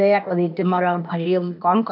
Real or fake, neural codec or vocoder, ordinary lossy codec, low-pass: fake; codec, 16 kHz, 0.8 kbps, ZipCodec; none; 5.4 kHz